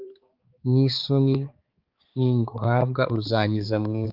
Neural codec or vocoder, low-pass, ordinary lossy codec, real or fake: codec, 16 kHz, 4 kbps, X-Codec, HuBERT features, trained on balanced general audio; 5.4 kHz; Opus, 32 kbps; fake